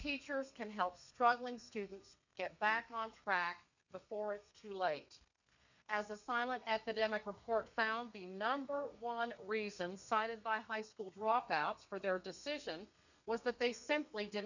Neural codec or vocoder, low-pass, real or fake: codec, 32 kHz, 1.9 kbps, SNAC; 7.2 kHz; fake